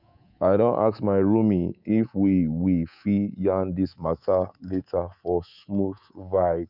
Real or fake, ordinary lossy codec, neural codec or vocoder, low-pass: fake; none; codec, 24 kHz, 3.1 kbps, DualCodec; 5.4 kHz